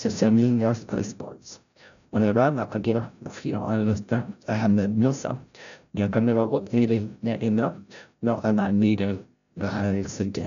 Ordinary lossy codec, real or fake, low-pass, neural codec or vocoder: none; fake; 7.2 kHz; codec, 16 kHz, 0.5 kbps, FreqCodec, larger model